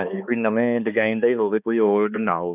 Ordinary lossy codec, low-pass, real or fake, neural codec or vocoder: none; 3.6 kHz; fake; codec, 16 kHz, 2 kbps, X-Codec, HuBERT features, trained on balanced general audio